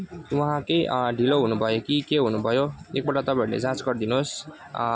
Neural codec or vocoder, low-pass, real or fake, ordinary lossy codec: none; none; real; none